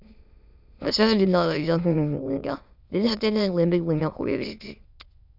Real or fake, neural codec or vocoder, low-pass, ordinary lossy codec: fake; autoencoder, 22.05 kHz, a latent of 192 numbers a frame, VITS, trained on many speakers; 5.4 kHz; none